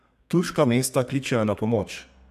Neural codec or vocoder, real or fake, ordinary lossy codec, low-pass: codec, 32 kHz, 1.9 kbps, SNAC; fake; none; 14.4 kHz